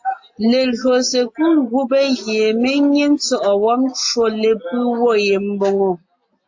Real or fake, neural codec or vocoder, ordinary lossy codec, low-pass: real; none; AAC, 48 kbps; 7.2 kHz